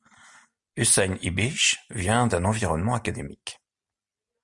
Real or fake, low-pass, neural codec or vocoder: real; 10.8 kHz; none